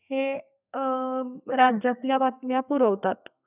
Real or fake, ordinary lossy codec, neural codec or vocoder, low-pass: fake; none; codec, 32 kHz, 1.9 kbps, SNAC; 3.6 kHz